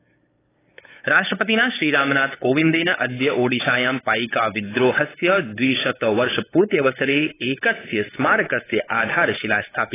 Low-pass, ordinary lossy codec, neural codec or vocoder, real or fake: 3.6 kHz; AAC, 16 kbps; codec, 16 kHz, 8 kbps, FunCodec, trained on LibriTTS, 25 frames a second; fake